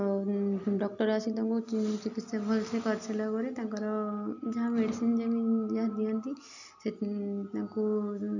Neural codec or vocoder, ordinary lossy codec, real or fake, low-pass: none; none; real; 7.2 kHz